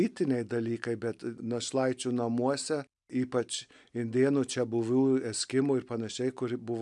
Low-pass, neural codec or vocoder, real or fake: 10.8 kHz; none; real